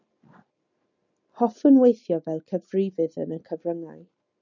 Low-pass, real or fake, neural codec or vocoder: 7.2 kHz; real; none